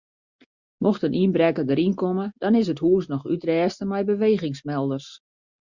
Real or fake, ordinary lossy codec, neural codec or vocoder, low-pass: real; Opus, 64 kbps; none; 7.2 kHz